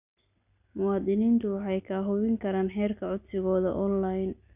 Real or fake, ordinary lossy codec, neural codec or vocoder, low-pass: real; none; none; 3.6 kHz